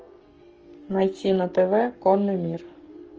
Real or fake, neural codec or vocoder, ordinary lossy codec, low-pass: fake; codec, 44.1 kHz, 7.8 kbps, Pupu-Codec; Opus, 24 kbps; 7.2 kHz